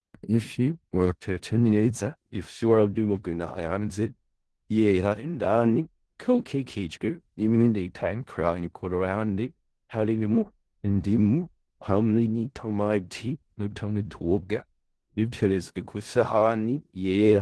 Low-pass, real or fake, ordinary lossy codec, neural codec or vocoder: 10.8 kHz; fake; Opus, 16 kbps; codec, 16 kHz in and 24 kHz out, 0.4 kbps, LongCat-Audio-Codec, four codebook decoder